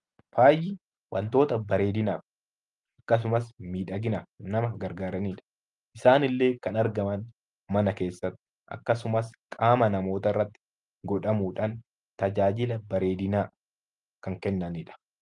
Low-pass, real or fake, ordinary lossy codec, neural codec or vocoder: 10.8 kHz; real; Opus, 32 kbps; none